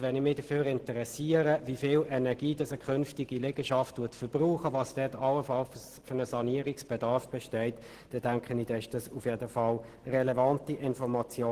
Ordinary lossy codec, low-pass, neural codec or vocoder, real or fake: Opus, 16 kbps; 14.4 kHz; none; real